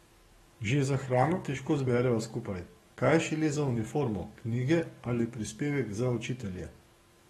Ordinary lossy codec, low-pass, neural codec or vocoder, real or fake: AAC, 32 kbps; 19.8 kHz; codec, 44.1 kHz, 7.8 kbps, DAC; fake